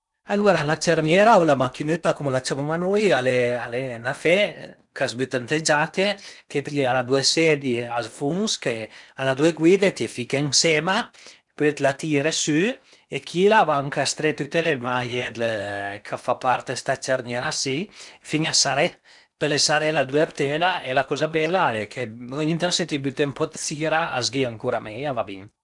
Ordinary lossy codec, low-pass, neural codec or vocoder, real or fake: none; 10.8 kHz; codec, 16 kHz in and 24 kHz out, 0.8 kbps, FocalCodec, streaming, 65536 codes; fake